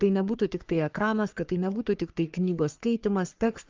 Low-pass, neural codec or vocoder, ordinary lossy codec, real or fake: 7.2 kHz; codec, 44.1 kHz, 3.4 kbps, Pupu-Codec; Opus, 24 kbps; fake